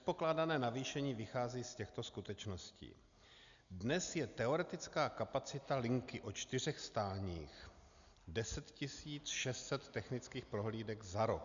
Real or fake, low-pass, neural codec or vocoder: real; 7.2 kHz; none